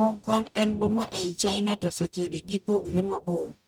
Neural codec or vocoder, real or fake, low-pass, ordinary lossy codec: codec, 44.1 kHz, 0.9 kbps, DAC; fake; none; none